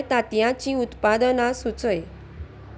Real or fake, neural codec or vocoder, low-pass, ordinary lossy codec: real; none; none; none